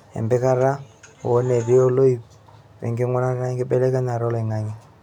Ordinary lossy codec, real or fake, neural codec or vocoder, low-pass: none; real; none; 19.8 kHz